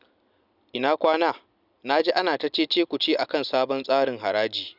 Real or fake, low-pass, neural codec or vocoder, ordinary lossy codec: real; 5.4 kHz; none; none